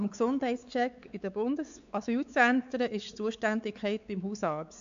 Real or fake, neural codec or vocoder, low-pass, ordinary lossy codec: fake; codec, 16 kHz, 4 kbps, X-Codec, WavLM features, trained on Multilingual LibriSpeech; 7.2 kHz; AAC, 96 kbps